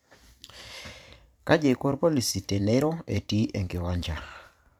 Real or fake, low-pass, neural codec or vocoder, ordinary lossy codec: fake; 19.8 kHz; vocoder, 44.1 kHz, 128 mel bands every 512 samples, BigVGAN v2; none